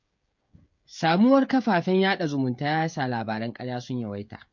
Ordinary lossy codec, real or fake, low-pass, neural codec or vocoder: MP3, 48 kbps; fake; 7.2 kHz; codec, 16 kHz, 16 kbps, FreqCodec, smaller model